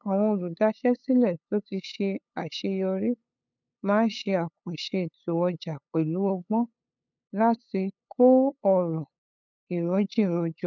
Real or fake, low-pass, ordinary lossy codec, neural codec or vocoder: fake; 7.2 kHz; none; codec, 16 kHz, 8 kbps, FunCodec, trained on LibriTTS, 25 frames a second